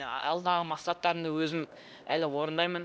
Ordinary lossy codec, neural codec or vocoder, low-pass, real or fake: none; codec, 16 kHz, 1 kbps, X-Codec, HuBERT features, trained on LibriSpeech; none; fake